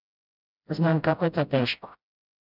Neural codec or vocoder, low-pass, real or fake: codec, 16 kHz, 0.5 kbps, FreqCodec, smaller model; 5.4 kHz; fake